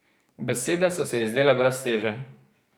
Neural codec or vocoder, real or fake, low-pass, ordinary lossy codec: codec, 44.1 kHz, 2.6 kbps, SNAC; fake; none; none